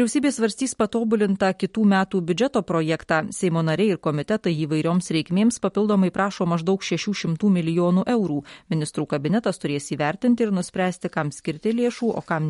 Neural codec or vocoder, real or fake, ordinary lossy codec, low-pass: none; real; MP3, 48 kbps; 19.8 kHz